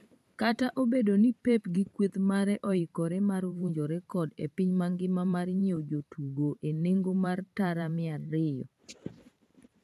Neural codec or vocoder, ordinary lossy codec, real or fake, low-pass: vocoder, 44.1 kHz, 128 mel bands every 512 samples, BigVGAN v2; none; fake; 14.4 kHz